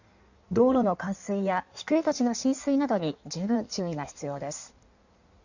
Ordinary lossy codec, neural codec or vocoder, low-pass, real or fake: none; codec, 16 kHz in and 24 kHz out, 1.1 kbps, FireRedTTS-2 codec; 7.2 kHz; fake